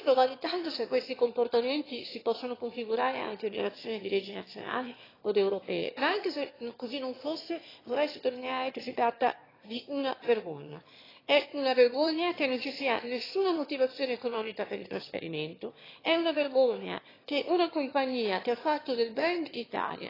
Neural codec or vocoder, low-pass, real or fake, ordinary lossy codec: autoencoder, 22.05 kHz, a latent of 192 numbers a frame, VITS, trained on one speaker; 5.4 kHz; fake; AAC, 24 kbps